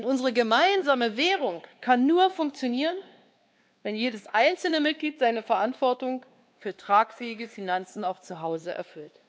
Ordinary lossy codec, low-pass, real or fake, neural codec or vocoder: none; none; fake; codec, 16 kHz, 2 kbps, X-Codec, WavLM features, trained on Multilingual LibriSpeech